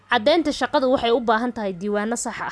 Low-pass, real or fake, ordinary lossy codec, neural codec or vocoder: none; real; none; none